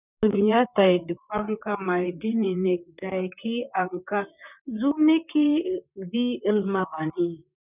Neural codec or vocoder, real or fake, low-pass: vocoder, 44.1 kHz, 128 mel bands, Pupu-Vocoder; fake; 3.6 kHz